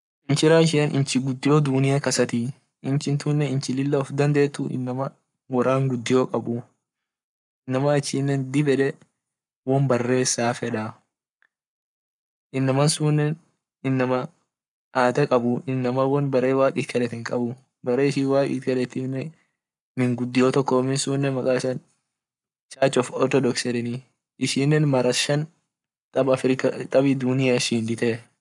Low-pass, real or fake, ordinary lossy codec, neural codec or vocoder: 10.8 kHz; fake; none; codec, 44.1 kHz, 7.8 kbps, Pupu-Codec